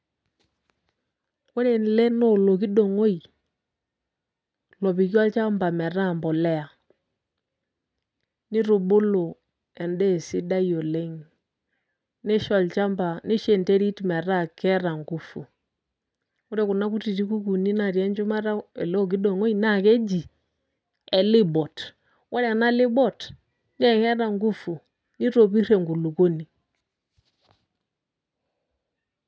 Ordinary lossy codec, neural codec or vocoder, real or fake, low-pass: none; none; real; none